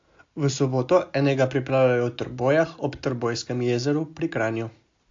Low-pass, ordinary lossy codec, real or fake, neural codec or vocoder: 7.2 kHz; AAC, 48 kbps; real; none